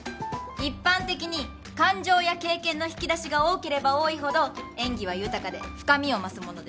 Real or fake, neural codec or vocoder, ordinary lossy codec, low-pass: real; none; none; none